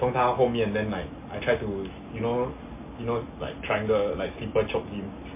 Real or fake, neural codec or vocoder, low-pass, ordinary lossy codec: real; none; 3.6 kHz; MP3, 24 kbps